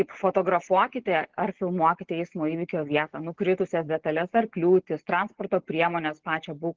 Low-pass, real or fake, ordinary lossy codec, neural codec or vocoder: 7.2 kHz; real; Opus, 16 kbps; none